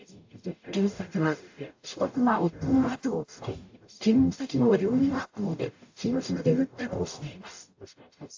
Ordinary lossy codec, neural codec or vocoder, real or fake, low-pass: AAC, 48 kbps; codec, 44.1 kHz, 0.9 kbps, DAC; fake; 7.2 kHz